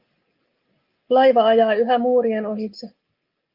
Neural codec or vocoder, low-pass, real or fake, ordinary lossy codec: none; 5.4 kHz; real; Opus, 24 kbps